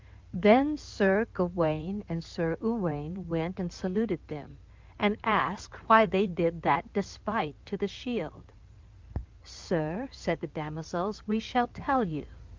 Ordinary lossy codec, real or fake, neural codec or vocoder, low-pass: Opus, 24 kbps; fake; codec, 16 kHz in and 24 kHz out, 2.2 kbps, FireRedTTS-2 codec; 7.2 kHz